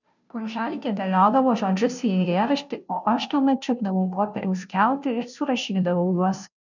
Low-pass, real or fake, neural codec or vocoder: 7.2 kHz; fake; codec, 16 kHz, 0.5 kbps, FunCodec, trained on Chinese and English, 25 frames a second